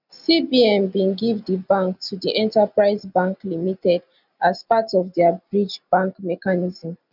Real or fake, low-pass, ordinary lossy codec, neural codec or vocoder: real; 5.4 kHz; none; none